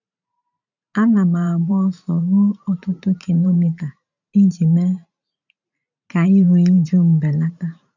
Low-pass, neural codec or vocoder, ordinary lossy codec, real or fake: 7.2 kHz; vocoder, 44.1 kHz, 128 mel bands every 512 samples, BigVGAN v2; none; fake